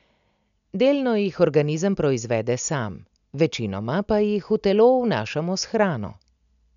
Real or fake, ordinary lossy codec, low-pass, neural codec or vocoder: real; none; 7.2 kHz; none